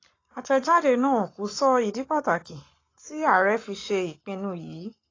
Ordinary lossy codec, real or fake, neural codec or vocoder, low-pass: AAC, 32 kbps; fake; codec, 44.1 kHz, 7.8 kbps, Pupu-Codec; 7.2 kHz